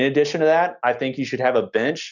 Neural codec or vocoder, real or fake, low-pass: none; real; 7.2 kHz